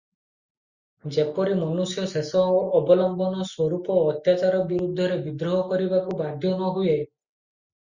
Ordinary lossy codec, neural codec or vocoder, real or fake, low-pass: Opus, 64 kbps; none; real; 7.2 kHz